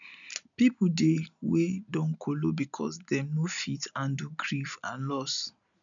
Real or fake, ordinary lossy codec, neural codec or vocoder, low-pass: real; none; none; 7.2 kHz